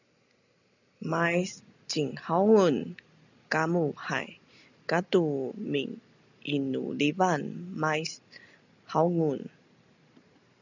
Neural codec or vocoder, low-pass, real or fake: none; 7.2 kHz; real